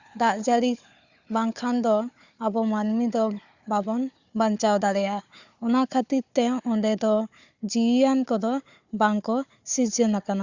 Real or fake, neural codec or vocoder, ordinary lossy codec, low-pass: fake; codec, 16 kHz, 4 kbps, FunCodec, trained on Chinese and English, 50 frames a second; Opus, 64 kbps; 7.2 kHz